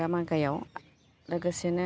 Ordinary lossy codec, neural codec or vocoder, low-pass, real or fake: none; none; none; real